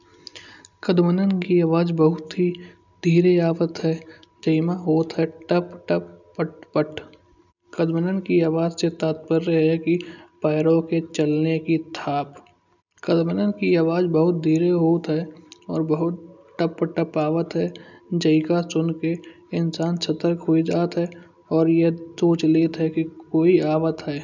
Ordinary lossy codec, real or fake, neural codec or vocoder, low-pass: none; real; none; 7.2 kHz